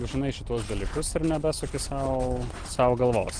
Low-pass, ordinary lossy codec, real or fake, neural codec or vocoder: 9.9 kHz; Opus, 16 kbps; real; none